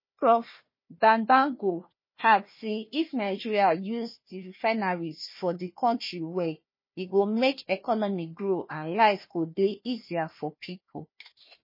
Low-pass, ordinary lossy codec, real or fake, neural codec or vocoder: 5.4 kHz; MP3, 24 kbps; fake; codec, 16 kHz, 1 kbps, FunCodec, trained on Chinese and English, 50 frames a second